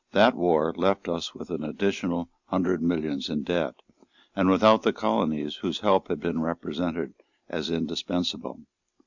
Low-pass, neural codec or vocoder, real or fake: 7.2 kHz; none; real